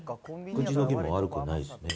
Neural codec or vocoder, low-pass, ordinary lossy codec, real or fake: none; none; none; real